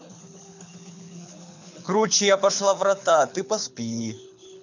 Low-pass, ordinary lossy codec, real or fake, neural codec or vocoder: 7.2 kHz; none; fake; codec, 24 kHz, 6 kbps, HILCodec